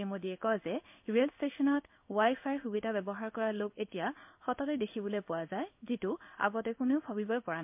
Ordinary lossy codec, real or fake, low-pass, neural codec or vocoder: MP3, 32 kbps; fake; 3.6 kHz; codec, 16 kHz in and 24 kHz out, 1 kbps, XY-Tokenizer